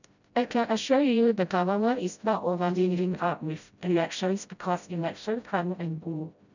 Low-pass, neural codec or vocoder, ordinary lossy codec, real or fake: 7.2 kHz; codec, 16 kHz, 0.5 kbps, FreqCodec, smaller model; none; fake